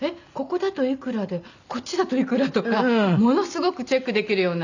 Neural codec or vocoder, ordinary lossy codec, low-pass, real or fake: none; none; 7.2 kHz; real